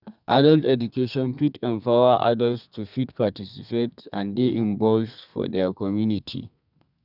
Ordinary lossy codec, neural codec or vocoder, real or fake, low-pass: none; codec, 32 kHz, 1.9 kbps, SNAC; fake; 5.4 kHz